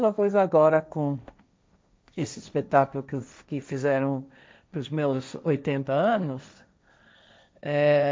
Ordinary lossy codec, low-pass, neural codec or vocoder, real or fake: none; none; codec, 16 kHz, 1.1 kbps, Voila-Tokenizer; fake